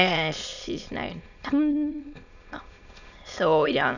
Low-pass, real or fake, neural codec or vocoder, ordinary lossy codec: 7.2 kHz; fake; autoencoder, 22.05 kHz, a latent of 192 numbers a frame, VITS, trained on many speakers; none